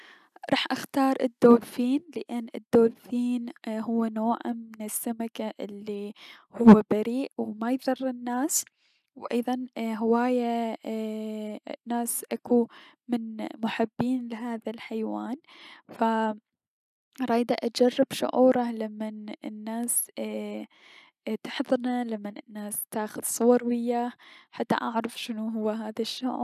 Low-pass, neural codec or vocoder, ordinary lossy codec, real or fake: 14.4 kHz; none; none; real